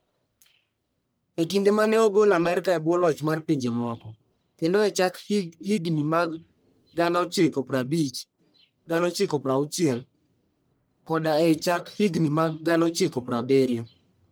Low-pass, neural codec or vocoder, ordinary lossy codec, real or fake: none; codec, 44.1 kHz, 1.7 kbps, Pupu-Codec; none; fake